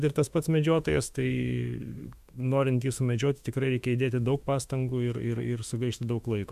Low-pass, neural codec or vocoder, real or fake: 14.4 kHz; autoencoder, 48 kHz, 32 numbers a frame, DAC-VAE, trained on Japanese speech; fake